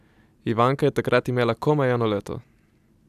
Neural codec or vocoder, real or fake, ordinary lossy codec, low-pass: none; real; AAC, 96 kbps; 14.4 kHz